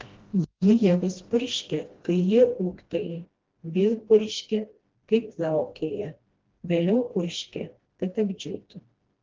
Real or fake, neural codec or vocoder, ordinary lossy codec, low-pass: fake; codec, 16 kHz, 1 kbps, FreqCodec, smaller model; Opus, 16 kbps; 7.2 kHz